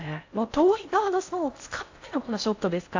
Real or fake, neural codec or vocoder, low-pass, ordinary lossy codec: fake; codec, 16 kHz in and 24 kHz out, 0.6 kbps, FocalCodec, streaming, 4096 codes; 7.2 kHz; AAC, 48 kbps